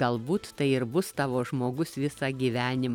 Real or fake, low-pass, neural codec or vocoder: real; 19.8 kHz; none